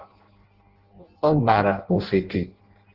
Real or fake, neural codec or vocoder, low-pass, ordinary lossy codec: fake; codec, 16 kHz in and 24 kHz out, 0.6 kbps, FireRedTTS-2 codec; 5.4 kHz; Opus, 16 kbps